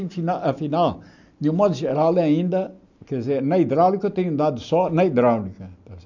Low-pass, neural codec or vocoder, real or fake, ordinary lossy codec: 7.2 kHz; none; real; none